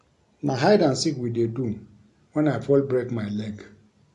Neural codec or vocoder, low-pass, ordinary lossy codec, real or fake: none; 10.8 kHz; AAC, 64 kbps; real